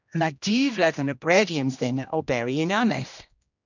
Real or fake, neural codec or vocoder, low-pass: fake; codec, 16 kHz, 1 kbps, X-Codec, HuBERT features, trained on general audio; 7.2 kHz